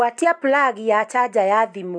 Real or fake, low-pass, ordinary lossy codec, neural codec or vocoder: real; 9.9 kHz; none; none